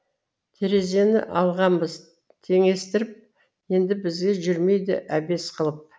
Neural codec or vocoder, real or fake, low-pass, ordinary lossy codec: none; real; none; none